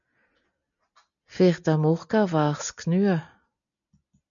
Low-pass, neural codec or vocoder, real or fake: 7.2 kHz; none; real